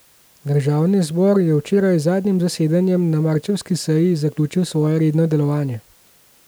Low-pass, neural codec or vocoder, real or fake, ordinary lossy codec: none; none; real; none